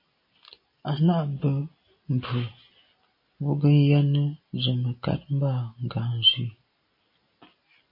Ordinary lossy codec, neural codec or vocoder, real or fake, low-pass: MP3, 24 kbps; none; real; 5.4 kHz